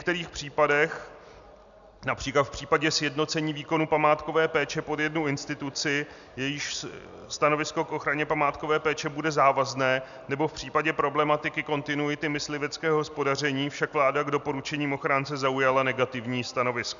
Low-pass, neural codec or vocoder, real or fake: 7.2 kHz; none; real